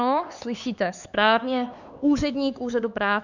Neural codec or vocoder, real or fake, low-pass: codec, 16 kHz, 4 kbps, X-Codec, HuBERT features, trained on LibriSpeech; fake; 7.2 kHz